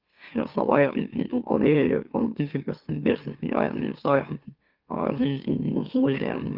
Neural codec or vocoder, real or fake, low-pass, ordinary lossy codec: autoencoder, 44.1 kHz, a latent of 192 numbers a frame, MeloTTS; fake; 5.4 kHz; Opus, 32 kbps